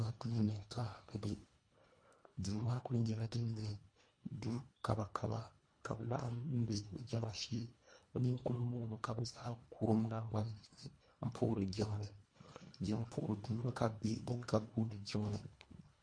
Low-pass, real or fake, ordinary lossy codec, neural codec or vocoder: 9.9 kHz; fake; MP3, 48 kbps; codec, 24 kHz, 1.5 kbps, HILCodec